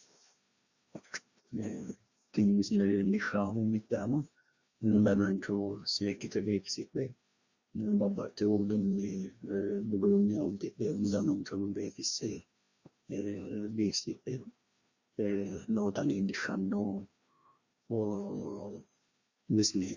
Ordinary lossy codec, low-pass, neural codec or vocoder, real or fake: Opus, 64 kbps; 7.2 kHz; codec, 16 kHz, 1 kbps, FreqCodec, larger model; fake